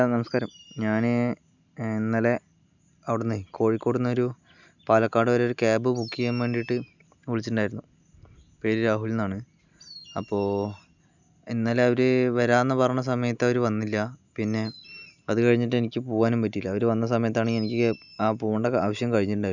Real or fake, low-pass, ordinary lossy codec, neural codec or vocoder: real; 7.2 kHz; none; none